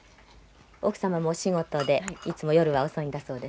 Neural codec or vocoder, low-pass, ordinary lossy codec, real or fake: none; none; none; real